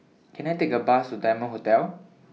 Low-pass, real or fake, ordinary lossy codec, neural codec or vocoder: none; real; none; none